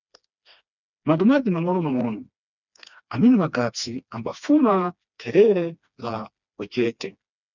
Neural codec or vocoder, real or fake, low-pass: codec, 16 kHz, 2 kbps, FreqCodec, smaller model; fake; 7.2 kHz